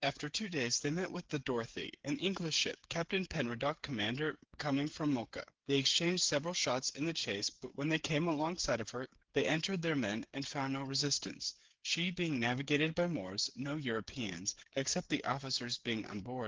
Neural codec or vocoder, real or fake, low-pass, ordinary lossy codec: codec, 16 kHz, 8 kbps, FreqCodec, smaller model; fake; 7.2 kHz; Opus, 16 kbps